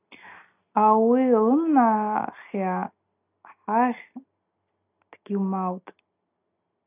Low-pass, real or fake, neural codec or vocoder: 3.6 kHz; real; none